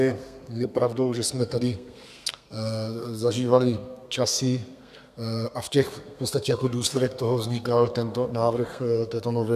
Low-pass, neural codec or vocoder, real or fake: 14.4 kHz; codec, 32 kHz, 1.9 kbps, SNAC; fake